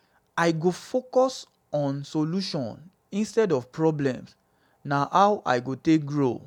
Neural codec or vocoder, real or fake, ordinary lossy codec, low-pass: none; real; none; 19.8 kHz